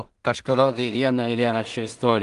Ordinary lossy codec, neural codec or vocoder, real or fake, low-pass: Opus, 24 kbps; codec, 16 kHz in and 24 kHz out, 0.4 kbps, LongCat-Audio-Codec, two codebook decoder; fake; 10.8 kHz